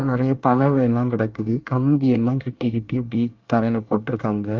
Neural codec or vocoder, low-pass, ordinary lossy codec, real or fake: codec, 24 kHz, 1 kbps, SNAC; 7.2 kHz; Opus, 24 kbps; fake